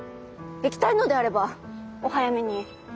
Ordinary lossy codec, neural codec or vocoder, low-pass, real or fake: none; none; none; real